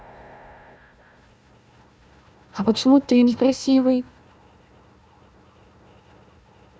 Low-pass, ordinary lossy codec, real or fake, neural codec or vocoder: none; none; fake; codec, 16 kHz, 1 kbps, FunCodec, trained on Chinese and English, 50 frames a second